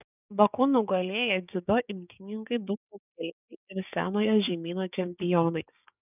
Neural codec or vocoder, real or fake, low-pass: codec, 16 kHz, 6 kbps, DAC; fake; 3.6 kHz